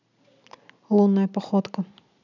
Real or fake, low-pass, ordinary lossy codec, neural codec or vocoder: real; 7.2 kHz; none; none